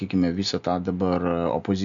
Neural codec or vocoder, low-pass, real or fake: none; 7.2 kHz; real